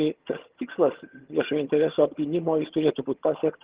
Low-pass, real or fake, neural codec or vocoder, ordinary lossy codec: 3.6 kHz; fake; vocoder, 22.05 kHz, 80 mel bands, HiFi-GAN; Opus, 16 kbps